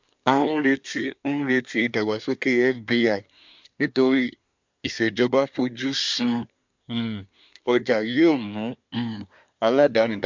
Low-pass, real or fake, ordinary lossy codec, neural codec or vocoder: 7.2 kHz; fake; MP3, 64 kbps; codec, 24 kHz, 1 kbps, SNAC